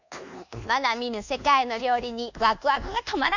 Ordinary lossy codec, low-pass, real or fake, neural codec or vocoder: none; 7.2 kHz; fake; codec, 24 kHz, 1.2 kbps, DualCodec